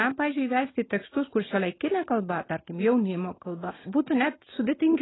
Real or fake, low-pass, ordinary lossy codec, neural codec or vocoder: real; 7.2 kHz; AAC, 16 kbps; none